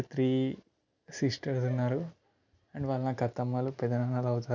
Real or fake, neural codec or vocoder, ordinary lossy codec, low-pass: real; none; none; 7.2 kHz